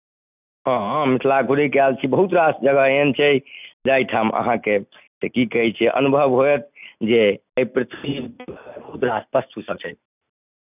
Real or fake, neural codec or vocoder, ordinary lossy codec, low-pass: real; none; none; 3.6 kHz